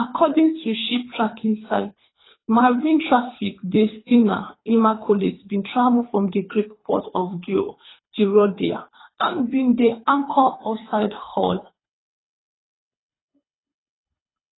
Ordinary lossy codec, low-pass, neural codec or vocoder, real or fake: AAC, 16 kbps; 7.2 kHz; codec, 24 kHz, 3 kbps, HILCodec; fake